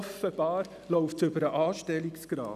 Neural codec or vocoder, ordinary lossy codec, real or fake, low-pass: none; none; real; 14.4 kHz